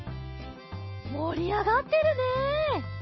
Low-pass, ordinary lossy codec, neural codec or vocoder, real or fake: 7.2 kHz; MP3, 24 kbps; none; real